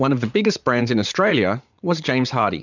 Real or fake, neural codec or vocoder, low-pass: fake; vocoder, 44.1 kHz, 80 mel bands, Vocos; 7.2 kHz